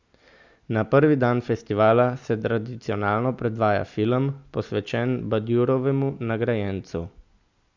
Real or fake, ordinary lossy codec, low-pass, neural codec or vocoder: real; Opus, 64 kbps; 7.2 kHz; none